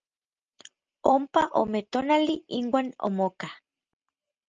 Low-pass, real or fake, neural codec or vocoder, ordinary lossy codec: 7.2 kHz; real; none; Opus, 24 kbps